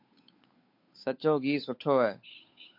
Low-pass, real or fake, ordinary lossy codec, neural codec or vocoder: 5.4 kHz; fake; MP3, 32 kbps; codec, 16 kHz, 8 kbps, FunCodec, trained on Chinese and English, 25 frames a second